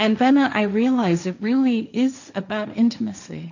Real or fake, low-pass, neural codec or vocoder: fake; 7.2 kHz; codec, 16 kHz, 1.1 kbps, Voila-Tokenizer